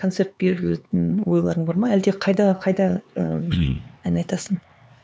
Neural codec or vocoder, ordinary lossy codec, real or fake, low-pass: codec, 16 kHz, 4 kbps, X-Codec, HuBERT features, trained on LibriSpeech; none; fake; none